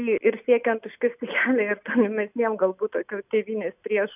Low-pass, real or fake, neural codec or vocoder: 3.6 kHz; real; none